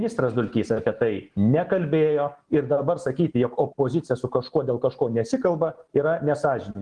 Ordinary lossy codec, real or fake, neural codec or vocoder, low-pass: Opus, 16 kbps; real; none; 9.9 kHz